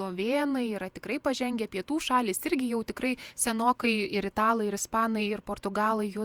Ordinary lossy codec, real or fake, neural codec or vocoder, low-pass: Opus, 64 kbps; fake; vocoder, 48 kHz, 128 mel bands, Vocos; 19.8 kHz